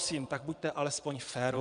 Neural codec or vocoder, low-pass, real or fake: vocoder, 22.05 kHz, 80 mel bands, Vocos; 9.9 kHz; fake